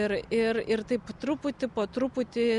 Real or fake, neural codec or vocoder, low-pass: real; none; 10.8 kHz